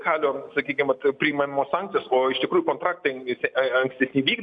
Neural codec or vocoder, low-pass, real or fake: none; 9.9 kHz; real